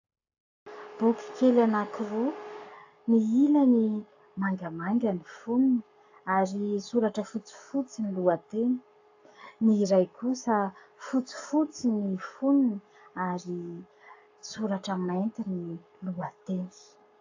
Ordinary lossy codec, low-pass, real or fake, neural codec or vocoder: AAC, 48 kbps; 7.2 kHz; fake; codec, 44.1 kHz, 7.8 kbps, Pupu-Codec